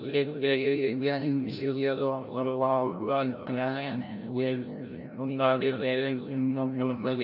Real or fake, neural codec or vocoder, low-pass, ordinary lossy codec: fake; codec, 16 kHz, 0.5 kbps, FreqCodec, larger model; 5.4 kHz; none